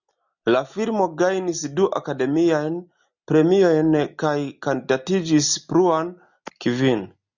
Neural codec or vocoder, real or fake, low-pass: none; real; 7.2 kHz